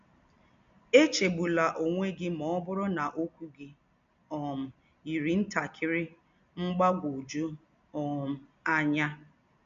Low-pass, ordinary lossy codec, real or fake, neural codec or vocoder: 7.2 kHz; MP3, 64 kbps; real; none